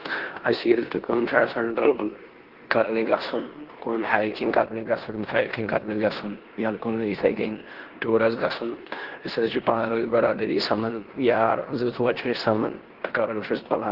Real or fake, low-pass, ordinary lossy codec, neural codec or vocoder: fake; 5.4 kHz; Opus, 16 kbps; codec, 16 kHz in and 24 kHz out, 0.9 kbps, LongCat-Audio-Codec, four codebook decoder